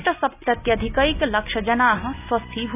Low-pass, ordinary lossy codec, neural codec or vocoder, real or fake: 3.6 kHz; none; none; real